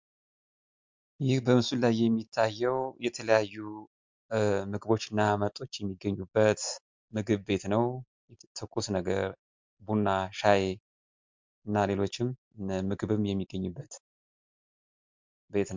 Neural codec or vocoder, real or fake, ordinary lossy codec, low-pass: none; real; MP3, 64 kbps; 7.2 kHz